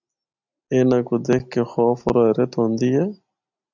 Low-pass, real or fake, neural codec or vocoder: 7.2 kHz; real; none